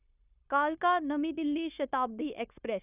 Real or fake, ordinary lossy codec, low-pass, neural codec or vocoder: fake; none; 3.6 kHz; codec, 16 kHz, 0.9 kbps, LongCat-Audio-Codec